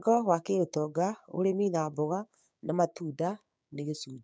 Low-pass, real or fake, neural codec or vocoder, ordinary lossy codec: none; fake; codec, 16 kHz, 6 kbps, DAC; none